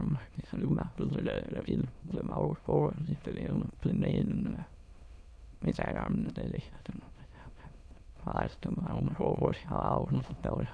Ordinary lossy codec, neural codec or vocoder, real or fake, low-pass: none; autoencoder, 22.05 kHz, a latent of 192 numbers a frame, VITS, trained on many speakers; fake; none